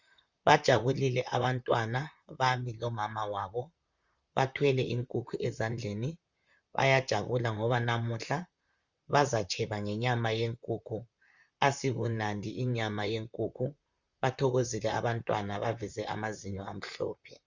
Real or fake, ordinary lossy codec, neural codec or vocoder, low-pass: fake; Opus, 64 kbps; vocoder, 44.1 kHz, 128 mel bands, Pupu-Vocoder; 7.2 kHz